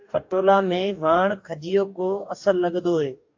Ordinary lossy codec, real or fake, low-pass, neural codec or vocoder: AAC, 48 kbps; fake; 7.2 kHz; codec, 44.1 kHz, 2.6 kbps, DAC